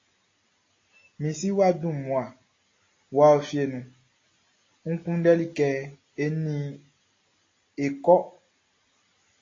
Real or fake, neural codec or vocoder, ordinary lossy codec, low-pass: real; none; AAC, 32 kbps; 7.2 kHz